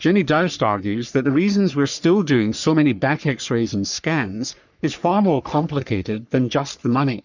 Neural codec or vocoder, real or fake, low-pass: codec, 44.1 kHz, 3.4 kbps, Pupu-Codec; fake; 7.2 kHz